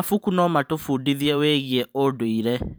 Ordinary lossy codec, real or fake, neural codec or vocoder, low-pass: none; fake; vocoder, 44.1 kHz, 128 mel bands every 512 samples, BigVGAN v2; none